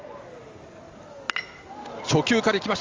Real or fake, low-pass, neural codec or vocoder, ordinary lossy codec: real; 7.2 kHz; none; Opus, 32 kbps